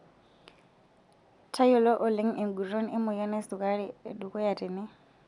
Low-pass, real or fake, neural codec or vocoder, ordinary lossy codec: 10.8 kHz; real; none; none